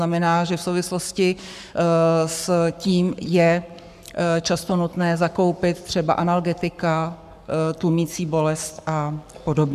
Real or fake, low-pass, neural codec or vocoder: fake; 14.4 kHz; codec, 44.1 kHz, 7.8 kbps, Pupu-Codec